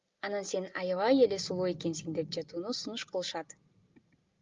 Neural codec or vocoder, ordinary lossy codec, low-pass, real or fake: none; Opus, 32 kbps; 7.2 kHz; real